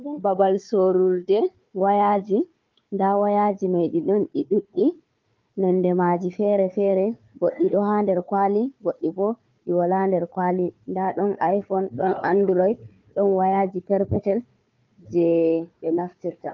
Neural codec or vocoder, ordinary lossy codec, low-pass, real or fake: codec, 16 kHz, 4 kbps, FunCodec, trained on Chinese and English, 50 frames a second; Opus, 24 kbps; 7.2 kHz; fake